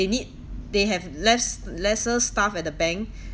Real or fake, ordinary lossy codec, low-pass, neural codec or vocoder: real; none; none; none